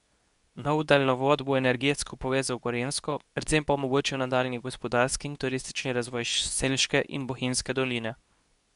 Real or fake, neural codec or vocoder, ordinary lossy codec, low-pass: fake; codec, 24 kHz, 0.9 kbps, WavTokenizer, medium speech release version 2; none; 10.8 kHz